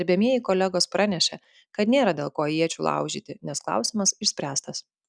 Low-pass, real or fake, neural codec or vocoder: 9.9 kHz; real; none